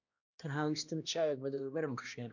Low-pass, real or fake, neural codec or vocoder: 7.2 kHz; fake; codec, 16 kHz, 1 kbps, X-Codec, HuBERT features, trained on balanced general audio